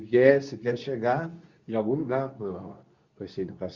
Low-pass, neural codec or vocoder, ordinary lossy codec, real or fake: 7.2 kHz; codec, 24 kHz, 0.9 kbps, WavTokenizer, medium speech release version 2; Opus, 64 kbps; fake